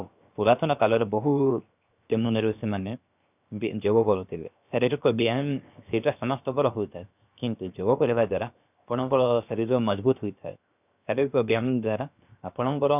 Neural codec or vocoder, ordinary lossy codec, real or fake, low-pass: codec, 16 kHz, 0.7 kbps, FocalCodec; none; fake; 3.6 kHz